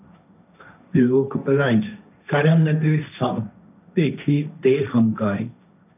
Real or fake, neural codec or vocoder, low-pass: fake; codec, 16 kHz, 1.1 kbps, Voila-Tokenizer; 3.6 kHz